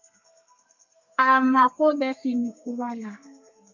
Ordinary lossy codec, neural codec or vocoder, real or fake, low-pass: MP3, 64 kbps; codec, 32 kHz, 1.9 kbps, SNAC; fake; 7.2 kHz